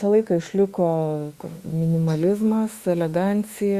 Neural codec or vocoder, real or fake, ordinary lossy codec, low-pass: autoencoder, 48 kHz, 32 numbers a frame, DAC-VAE, trained on Japanese speech; fake; Opus, 64 kbps; 14.4 kHz